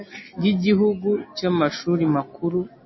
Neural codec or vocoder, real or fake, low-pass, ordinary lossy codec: none; real; 7.2 kHz; MP3, 24 kbps